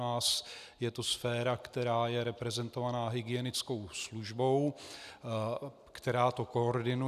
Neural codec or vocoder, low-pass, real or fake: none; 14.4 kHz; real